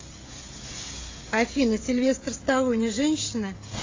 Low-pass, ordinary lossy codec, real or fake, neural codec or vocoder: 7.2 kHz; AAC, 32 kbps; fake; codec, 16 kHz, 16 kbps, FreqCodec, smaller model